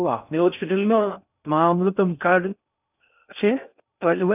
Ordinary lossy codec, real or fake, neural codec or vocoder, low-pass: none; fake; codec, 16 kHz in and 24 kHz out, 0.6 kbps, FocalCodec, streaming, 4096 codes; 3.6 kHz